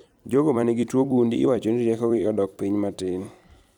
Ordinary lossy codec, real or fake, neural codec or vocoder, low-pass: none; fake; vocoder, 44.1 kHz, 128 mel bands every 256 samples, BigVGAN v2; 19.8 kHz